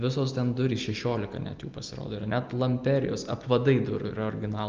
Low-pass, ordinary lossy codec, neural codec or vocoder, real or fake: 7.2 kHz; Opus, 24 kbps; none; real